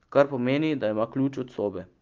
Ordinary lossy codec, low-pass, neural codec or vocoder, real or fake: Opus, 24 kbps; 7.2 kHz; none; real